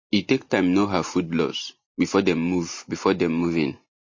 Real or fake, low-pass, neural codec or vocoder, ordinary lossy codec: real; 7.2 kHz; none; MP3, 32 kbps